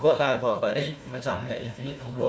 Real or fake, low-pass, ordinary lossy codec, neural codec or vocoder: fake; none; none; codec, 16 kHz, 1 kbps, FunCodec, trained on Chinese and English, 50 frames a second